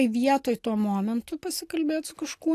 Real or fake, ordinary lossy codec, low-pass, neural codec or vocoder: real; AAC, 64 kbps; 14.4 kHz; none